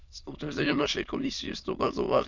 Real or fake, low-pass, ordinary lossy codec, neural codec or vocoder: fake; 7.2 kHz; none; autoencoder, 22.05 kHz, a latent of 192 numbers a frame, VITS, trained on many speakers